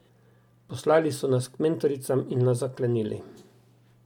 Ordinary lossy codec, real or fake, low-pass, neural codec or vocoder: MP3, 96 kbps; real; 19.8 kHz; none